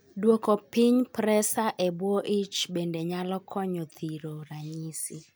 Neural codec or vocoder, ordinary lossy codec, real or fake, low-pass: none; none; real; none